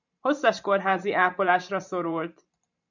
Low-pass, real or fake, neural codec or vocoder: 7.2 kHz; fake; vocoder, 44.1 kHz, 128 mel bands every 512 samples, BigVGAN v2